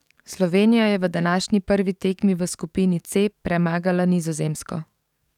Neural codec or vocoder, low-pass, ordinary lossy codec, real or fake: codec, 44.1 kHz, 7.8 kbps, DAC; 19.8 kHz; none; fake